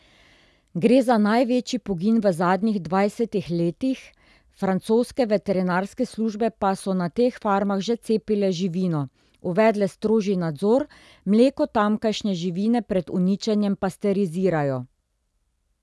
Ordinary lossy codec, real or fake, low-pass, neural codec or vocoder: none; real; none; none